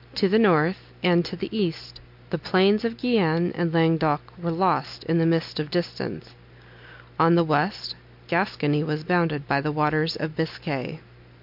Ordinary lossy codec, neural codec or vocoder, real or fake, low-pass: MP3, 48 kbps; none; real; 5.4 kHz